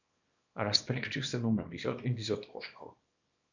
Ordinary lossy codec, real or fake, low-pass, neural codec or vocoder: none; fake; 7.2 kHz; codec, 24 kHz, 0.9 kbps, WavTokenizer, small release